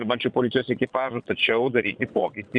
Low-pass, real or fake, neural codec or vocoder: 9.9 kHz; fake; vocoder, 22.05 kHz, 80 mel bands, Vocos